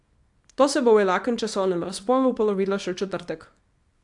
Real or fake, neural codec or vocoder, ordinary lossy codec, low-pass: fake; codec, 24 kHz, 0.9 kbps, WavTokenizer, small release; AAC, 64 kbps; 10.8 kHz